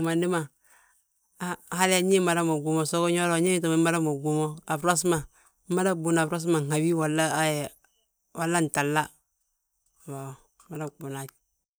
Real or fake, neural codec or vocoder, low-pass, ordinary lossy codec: real; none; none; none